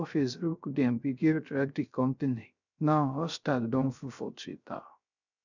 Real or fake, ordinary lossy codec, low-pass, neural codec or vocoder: fake; none; 7.2 kHz; codec, 16 kHz, 0.3 kbps, FocalCodec